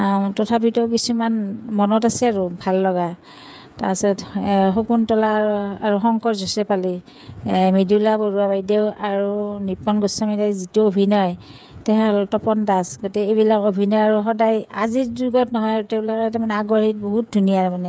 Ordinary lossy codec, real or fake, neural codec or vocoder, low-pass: none; fake; codec, 16 kHz, 8 kbps, FreqCodec, smaller model; none